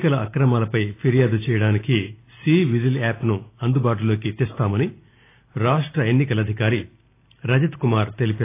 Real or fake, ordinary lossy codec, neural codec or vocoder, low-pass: real; AAC, 24 kbps; none; 3.6 kHz